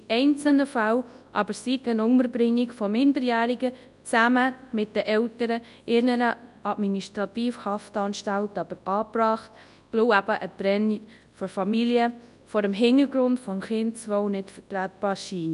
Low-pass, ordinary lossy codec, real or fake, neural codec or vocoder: 10.8 kHz; none; fake; codec, 24 kHz, 0.9 kbps, WavTokenizer, large speech release